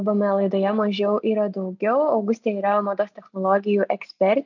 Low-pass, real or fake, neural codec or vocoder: 7.2 kHz; real; none